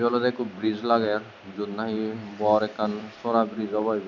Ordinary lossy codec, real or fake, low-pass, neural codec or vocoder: none; real; 7.2 kHz; none